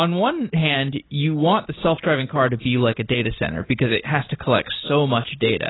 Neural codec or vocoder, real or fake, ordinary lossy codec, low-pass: none; real; AAC, 16 kbps; 7.2 kHz